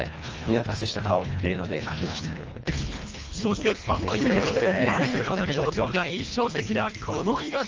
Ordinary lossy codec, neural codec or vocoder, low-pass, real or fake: Opus, 24 kbps; codec, 24 kHz, 1.5 kbps, HILCodec; 7.2 kHz; fake